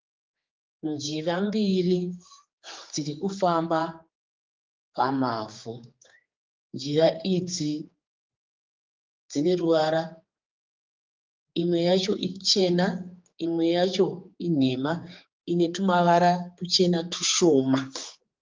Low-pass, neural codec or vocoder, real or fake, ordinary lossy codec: 7.2 kHz; codec, 16 kHz, 4 kbps, X-Codec, HuBERT features, trained on general audio; fake; Opus, 32 kbps